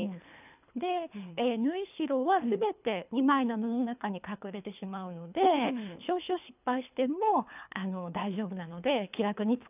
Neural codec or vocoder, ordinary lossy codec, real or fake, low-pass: codec, 24 kHz, 3 kbps, HILCodec; none; fake; 3.6 kHz